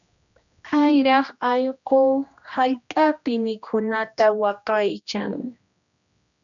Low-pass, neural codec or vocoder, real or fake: 7.2 kHz; codec, 16 kHz, 1 kbps, X-Codec, HuBERT features, trained on general audio; fake